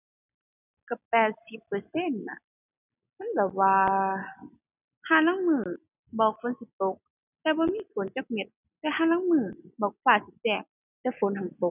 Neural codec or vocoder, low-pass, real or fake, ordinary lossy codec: none; 3.6 kHz; real; none